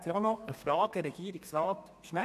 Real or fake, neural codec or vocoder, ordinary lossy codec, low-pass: fake; codec, 32 kHz, 1.9 kbps, SNAC; none; 14.4 kHz